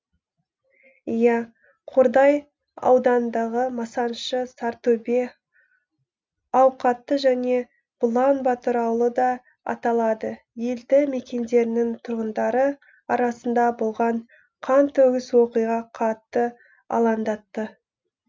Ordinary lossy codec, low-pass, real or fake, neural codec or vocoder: none; none; real; none